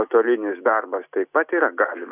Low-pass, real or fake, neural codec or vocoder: 3.6 kHz; real; none